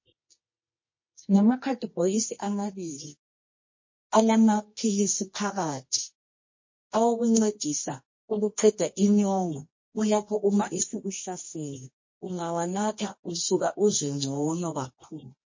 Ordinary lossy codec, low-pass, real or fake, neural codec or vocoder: MP3, 32 kbps; 7.2 kHz; fake; codec, 24 kHz, 0.9 kbps, WavTokenizer, medium music audio release